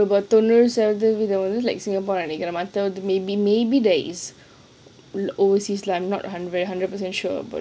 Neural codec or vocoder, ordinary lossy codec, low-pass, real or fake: none; none; none; real